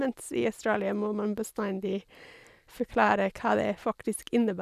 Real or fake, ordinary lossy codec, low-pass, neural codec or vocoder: real; none; 14.4 kHz; none